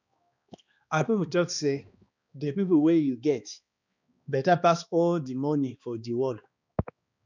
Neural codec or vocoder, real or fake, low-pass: codec, 16 kHz, 2 kbps, X-Codec, HuBERT features, trained on balanced general audio; fake; 7.2 kHz